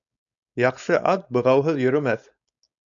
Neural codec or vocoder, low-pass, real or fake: codec, 16 kHz, 4.8 kbps, FACodec; 7.2 kHz; fake